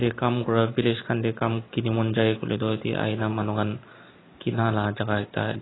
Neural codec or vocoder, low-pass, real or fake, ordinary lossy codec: none; 7.2 kHz; real; AAC, 16 kbps